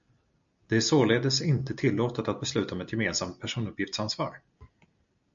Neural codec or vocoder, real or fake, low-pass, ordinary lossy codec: none; real; 7.2 kHz; MP3, 64 kbps